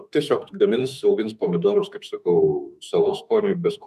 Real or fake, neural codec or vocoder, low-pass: fake; autoencoder, 48 kHz, 32 numbers a frame, DAC-VAE, trained on Japanese speech; 14.4 kHz